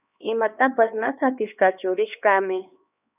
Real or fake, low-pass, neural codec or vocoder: fake; 3.6 kHz; codec, 16 kHz, 2 kbps, X-Codec, HuBERT features, trained on LibriSpeech